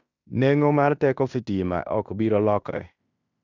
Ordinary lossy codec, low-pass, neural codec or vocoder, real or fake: none; 7.2 kHz; codec, 16 kHz in and 24 kHz out, 0.9 kbps, LongCat-Audio-Codec, fine tuned four codebook decoder; fake